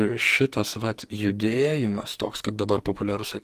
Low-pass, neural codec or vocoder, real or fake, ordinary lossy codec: 14.4 kHz; codec, 44.1 kHz, 2.6 kbps, SNAC; fake; Opus, 24 kbps